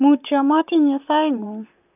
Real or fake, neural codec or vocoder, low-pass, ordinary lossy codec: fake; vocoder, 44.1 kHz, 128 mel bands, Pupu-Vocoder; 3.6 kHz; none